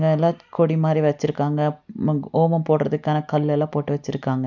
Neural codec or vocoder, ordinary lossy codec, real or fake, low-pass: none; none; real; 7.2 kHz